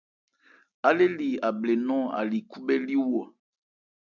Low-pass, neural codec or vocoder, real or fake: 7.2 kHz; none; real